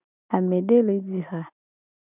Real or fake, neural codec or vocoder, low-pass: real; none; 3.6 kHz